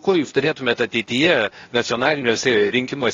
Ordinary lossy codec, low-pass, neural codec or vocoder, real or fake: AAC, 32 kbps; 7.2 kHz; codec, 16 kHz, 0.8 kbps, ZipCodec; fake